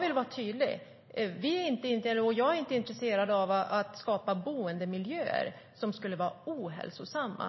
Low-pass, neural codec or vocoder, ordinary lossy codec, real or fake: 7.2 kHz; none; MP3, 24 kbps; real